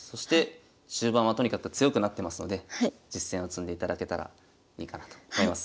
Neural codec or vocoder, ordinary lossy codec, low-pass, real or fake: none; none; none; real